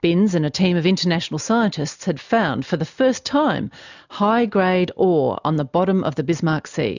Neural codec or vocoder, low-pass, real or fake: none; 7.2 kHz; real